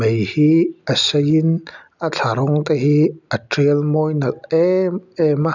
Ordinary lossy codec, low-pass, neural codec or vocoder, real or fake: none; 7.2 kHz; none; real